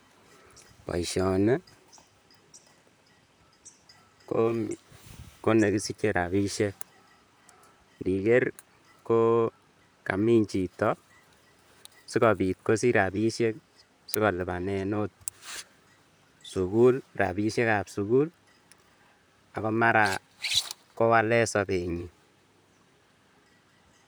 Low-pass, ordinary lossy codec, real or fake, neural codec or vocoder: none; none; fake; vocoder, 44.1 kHz, 128 mel bands, Pupu-Vocoder